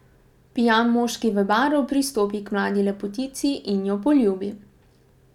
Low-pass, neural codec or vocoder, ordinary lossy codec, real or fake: 19.8 kHz; none; none; real